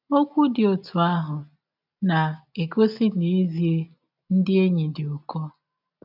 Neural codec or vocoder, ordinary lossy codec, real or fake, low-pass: none; none; real; 5.4 kHz